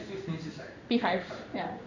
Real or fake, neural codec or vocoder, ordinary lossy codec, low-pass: fake; codec, 16 kHz in and 24 kHz out, 1 kbps, XY-Tokenizer; none; 7.2 kHz